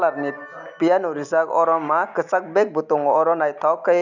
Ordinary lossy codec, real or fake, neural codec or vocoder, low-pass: none; real; none; 7.2 kHz